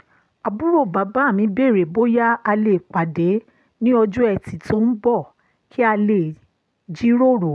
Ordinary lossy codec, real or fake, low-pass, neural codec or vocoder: none; real; none; none